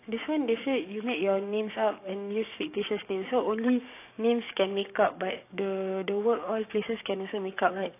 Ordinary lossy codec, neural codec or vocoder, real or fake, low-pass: AAC, 24 kbps; codec, 16 kHz, 6 kbps, DAC; fake; 3.6 kHz